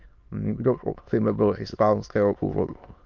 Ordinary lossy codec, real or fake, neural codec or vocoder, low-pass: Opus, 24 kbps; fake; autoencoder, 22.05 kHz, a latent of 192 numbers a frame, VITS, trained on many speakers; 7.2 kHz